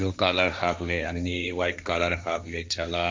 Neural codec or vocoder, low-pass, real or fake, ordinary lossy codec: codec, 16 kHz, 1.1 kbps, Voila-Tokenizer; none; fake; none